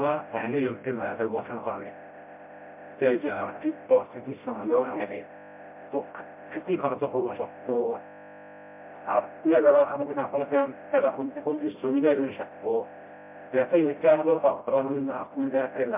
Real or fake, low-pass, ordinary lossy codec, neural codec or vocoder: fake; 3.6 kHz; MP3, 32 kbps; codec, 16 kHz, 0.5 kbps, FreqCodec, smaller model